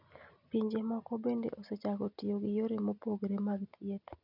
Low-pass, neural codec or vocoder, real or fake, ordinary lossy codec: 5.4 kHz; none; real; none